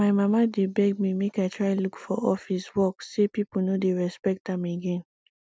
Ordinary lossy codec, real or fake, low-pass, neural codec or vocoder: none; real; none; none